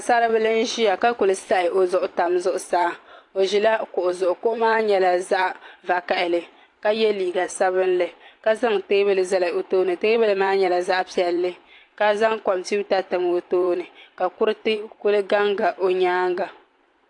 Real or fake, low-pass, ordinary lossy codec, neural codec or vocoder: fake; 10.8 kHz; AAC, 48 kbps; vocoder, 44.1 kHz, 128 mel bands every 512 samples, BigVGAN v2